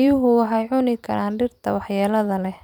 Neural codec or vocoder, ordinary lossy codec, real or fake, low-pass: vocoder, 44.1 kHz, 128 mel bands every 256 samples, BigVGAN v2; none; fake; 19.8 kHz